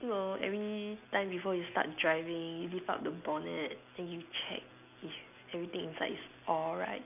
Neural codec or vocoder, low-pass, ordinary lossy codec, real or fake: none; 3.6 kHz; none; real